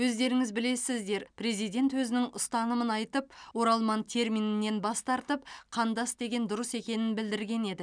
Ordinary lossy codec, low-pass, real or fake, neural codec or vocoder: none; 9.9 kHz; real; none